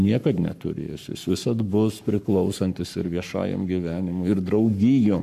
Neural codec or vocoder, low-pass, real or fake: codec, 44.1 kHz, 7.8 kbps, Pupu-Codec; 14.4 kHz; fake